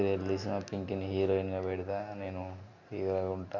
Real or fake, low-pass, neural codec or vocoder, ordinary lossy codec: real; 7.2 kHz; none; none